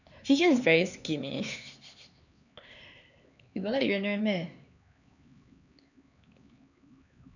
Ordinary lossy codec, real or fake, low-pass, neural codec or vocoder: none; fake; 7.2 kHz; codec, 16 kHz, 4 kbps, X-Codec, HuBERT features, trained on LibriSpeech